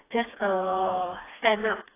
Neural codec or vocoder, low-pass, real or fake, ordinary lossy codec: codec, 16 kHz, 2 kbps, FreqCodec, smaller model; 3.6 kHz; fake; AAC, 16 kbps